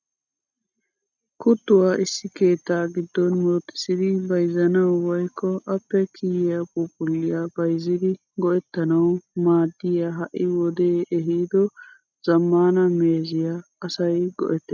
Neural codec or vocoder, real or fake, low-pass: none; real; 7.2 kHz